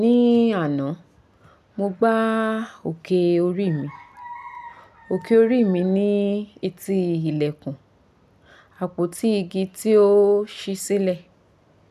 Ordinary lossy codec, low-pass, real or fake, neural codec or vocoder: none; 14.4 kHz; real; none